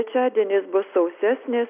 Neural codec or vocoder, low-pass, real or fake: none; 3.6 kHz; real